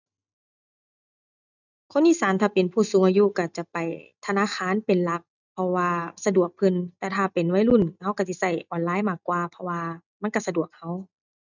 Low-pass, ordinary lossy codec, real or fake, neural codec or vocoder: 7.2 kHz; none; real; none